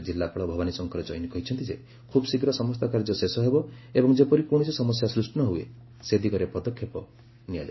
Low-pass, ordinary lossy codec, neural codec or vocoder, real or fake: 7.2 kHz; MP3, 24 kbps; none; real